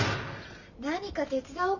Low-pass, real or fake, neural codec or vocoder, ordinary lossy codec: 7.2 kHz; real; none; none